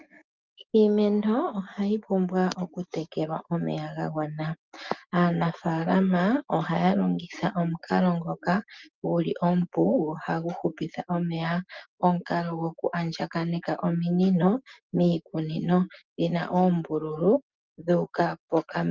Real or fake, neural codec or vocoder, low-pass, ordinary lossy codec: real; none; 7.2 kHz; Opus, 32 kbps